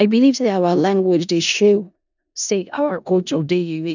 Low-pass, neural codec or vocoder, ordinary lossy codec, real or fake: 7.2 kHz; codec, 16 kHz in and 24 kHz out, 0.4 kbps, LongCat-Audio-Codec, four codebook decoder; none; fake